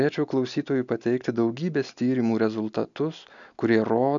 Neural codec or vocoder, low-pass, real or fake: none; 7.2 kHz; real